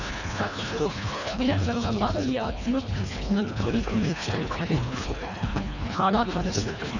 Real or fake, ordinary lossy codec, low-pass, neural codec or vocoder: fake; none; 7.2 kHz; codec, 24 kHz, 1.5 kbps, HILCodec